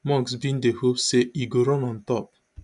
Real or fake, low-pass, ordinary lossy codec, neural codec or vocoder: real; 10.8 kHz; none; none